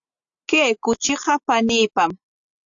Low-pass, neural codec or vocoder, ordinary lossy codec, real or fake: 7.2 kHz; none; AAC, 64 kbps; real